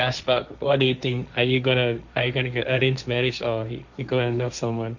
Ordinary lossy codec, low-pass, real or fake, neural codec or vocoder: none; 7.2 kHz; fake; codec, 16 kHz, 1.1 kbps, Voila-Tokenizer